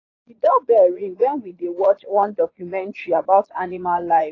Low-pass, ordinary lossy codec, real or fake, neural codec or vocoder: 7.2 kHz; AAC, 32 kbps; fake; vocoder, 22.05 kHz, 80 mel bands, Vocos